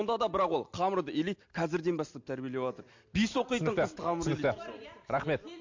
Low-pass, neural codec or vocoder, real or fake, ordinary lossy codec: 7.2 kHz; none; real; MP3, 48 kbps